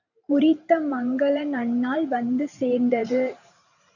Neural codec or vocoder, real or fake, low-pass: vocoder, 44.1 kHz, 128 mel bands every 512 samples, BigVGAN v2; fake; 7.2 kHz